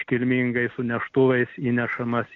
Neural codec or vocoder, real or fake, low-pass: none; real; 7.2 kHz